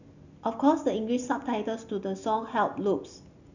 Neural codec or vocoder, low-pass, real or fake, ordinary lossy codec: none; 7.2 kHz; real; none